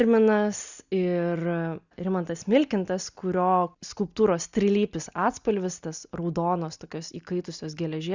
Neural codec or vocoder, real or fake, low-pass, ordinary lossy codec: none; real; 7.2 kHz; Opus, 64 kbps